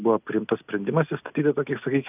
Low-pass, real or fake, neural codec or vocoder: 3.6 kHz; real; none